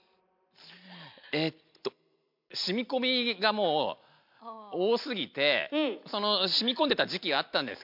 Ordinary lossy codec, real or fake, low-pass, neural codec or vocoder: MP3, 48 kbps; real; 5.4 kHz; none